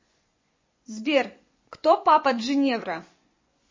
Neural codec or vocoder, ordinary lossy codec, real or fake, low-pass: vocoder, 24 kHz, 100 mel bands, Vocos; MP3, 32 kbps; fake; 7.2 kHz